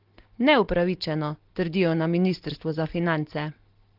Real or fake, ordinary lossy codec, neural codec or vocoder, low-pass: real; Opus, 16 kbps; none; 5.4 kHz